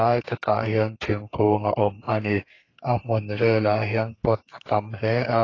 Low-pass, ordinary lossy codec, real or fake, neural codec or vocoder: 7.2 kHz; AAC, 32 kbps; fake; codec, 32 kHz, 1.9 kbps, SNAC